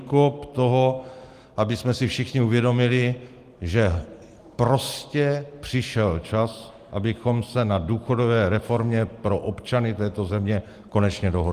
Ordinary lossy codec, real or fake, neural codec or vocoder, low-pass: Opus, 24 kbps; real; none; 14.4 kHz